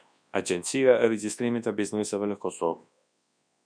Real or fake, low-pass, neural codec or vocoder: fake; 9.9 kHz; codec, 24 kHz, 0.9 kbps, WavTokenizer, large speech release